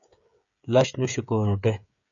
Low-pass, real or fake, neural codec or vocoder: 7.2 kHz; fake; codec, 16 kHz, 16 kbps, FreqCodec, smaller model